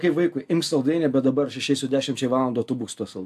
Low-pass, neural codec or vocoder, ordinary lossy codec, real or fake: 14.4 kHz; none; AAC, 96 kbps; real